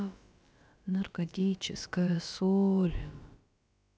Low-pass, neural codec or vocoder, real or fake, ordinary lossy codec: none; codec, 16 kHz, about 1 kbps, DyCAST, with the encoder's durations; fake; none